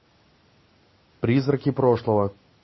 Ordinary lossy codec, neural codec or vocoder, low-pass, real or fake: MP3, 24 kbps; none; 7.2 kHz; real